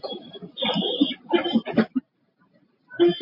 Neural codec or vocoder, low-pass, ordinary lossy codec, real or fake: none; 5.4 kHz; MP3, 32 kbps; real